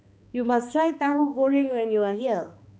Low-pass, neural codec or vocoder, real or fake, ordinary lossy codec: none; codec, 16 kHz, 2 kbps, X-Codec, HuBERT features, trained on balanced general audio; fake; none